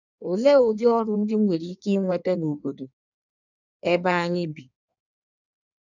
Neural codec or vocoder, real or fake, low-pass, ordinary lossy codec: codec, 16 kHz in and 24 kHz out, 1.1 kbps, FireRedTTS-2 codec; fake; 7.2 kHz; none